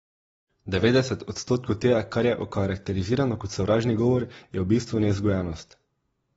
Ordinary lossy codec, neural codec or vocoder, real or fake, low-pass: AAC, 24 kbps; none; real; 19.8 kHz